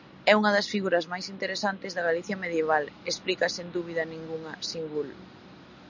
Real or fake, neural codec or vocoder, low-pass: real; none; 7.2 kHz